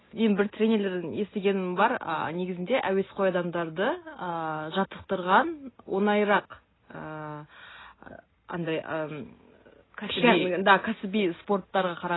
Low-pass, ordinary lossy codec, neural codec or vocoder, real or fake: 7.2 kHz; AAC, 16 kbps; none; real